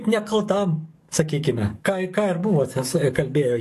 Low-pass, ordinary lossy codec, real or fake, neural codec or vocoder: 14.4 kHz; AAC, 64 kbps; fake; codec, 44.1 kHz, 7.8 kbps, DAC